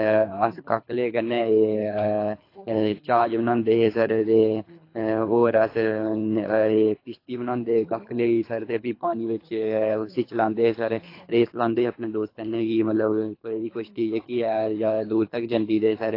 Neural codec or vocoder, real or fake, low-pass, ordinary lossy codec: codec, 24 kHz, 3 kbps, HILCodec; fake; 5.4 kHz; AAC, 32 kbps